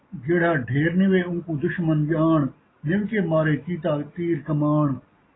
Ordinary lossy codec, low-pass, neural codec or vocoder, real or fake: AAC, 16 kbps; 7.2 kHz; none; real